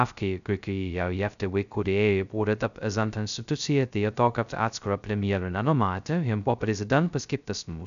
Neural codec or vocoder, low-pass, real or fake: codec, 16 kHz, 0.2 kbps, FocalCodec; 7.2 kHz; fake